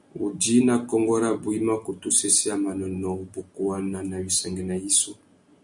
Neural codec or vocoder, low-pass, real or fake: none; 10.8 kHz; real